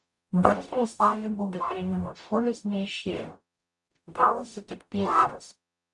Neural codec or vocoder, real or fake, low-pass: codec, 44.1 kHz, 0.9 kbps, DAC; fake; 10.8 kHz